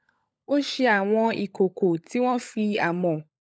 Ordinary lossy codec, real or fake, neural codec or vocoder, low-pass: none; fake; codec, 16 kHz, 16 kbps, FunCodec, trained on LibriTTS, 50 frames a second; none